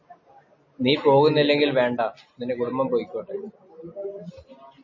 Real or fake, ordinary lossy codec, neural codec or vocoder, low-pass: real; MP3, 32 kbps; none; 7.2 kHz